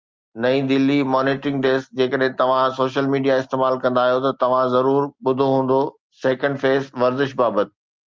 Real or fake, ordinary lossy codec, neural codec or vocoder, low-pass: real; Opus, 24 kbps; none; 7.2 kHz